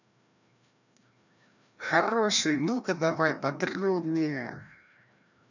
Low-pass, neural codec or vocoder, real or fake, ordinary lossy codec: 7.2 kHz; codec, 16 kHz, 1 kbps, FreqCodec, larger model; fake; none